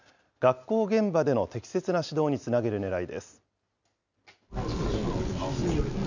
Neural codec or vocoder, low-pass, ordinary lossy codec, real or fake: none; 7.2 kHz; none; real